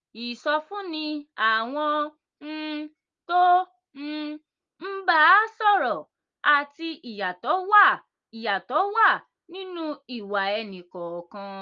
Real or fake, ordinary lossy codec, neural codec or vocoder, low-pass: real; Opus, 32 kbps; none; 7.2 kHz